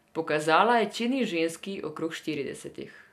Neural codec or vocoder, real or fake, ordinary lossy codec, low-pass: none; real; none; 14.4 kHz